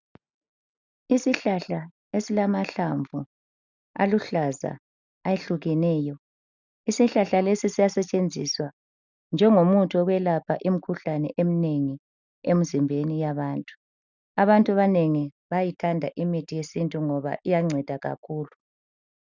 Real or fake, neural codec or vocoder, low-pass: real; none; 7.2 kHz